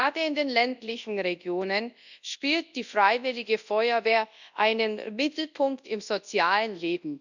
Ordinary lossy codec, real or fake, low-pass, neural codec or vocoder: none; fake; 7.2 kHz; codec, 24 kHz, 0.9 kbps, WavTokenizer, large speech release